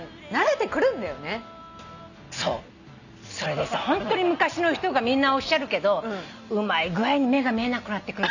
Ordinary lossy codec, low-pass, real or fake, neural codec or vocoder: none; 7.2 kHz; real; none